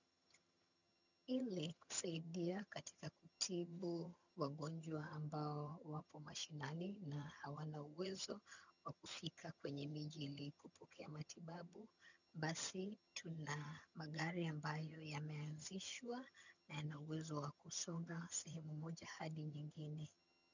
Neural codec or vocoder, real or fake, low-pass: vocoder, 22.05 kHz, 80 mel bands, HiFi-GAN; fake; 7.2 kHz